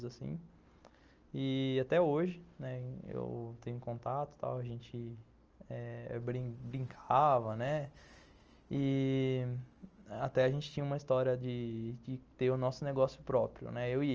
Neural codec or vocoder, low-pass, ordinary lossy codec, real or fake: none; 7.2 kHz; Opus, 24 kbps; real